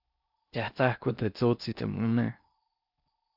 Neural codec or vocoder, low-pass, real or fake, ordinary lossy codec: codec, 16 kHz in and 24 kHz out, 0.6 kbps, FocalCodec, streaming, 4096 codes; 5.4 kHz; fake; AAC, 48 kbps